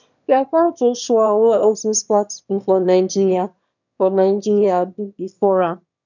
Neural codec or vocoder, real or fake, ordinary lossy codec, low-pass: autoencoder, 22.05 kHz, a latent of 192 numbers a frame, VITS, trained on one speaker; fake; none; 7.2 kHz